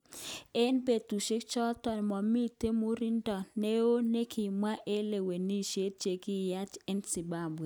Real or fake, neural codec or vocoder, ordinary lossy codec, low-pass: real; none; none; none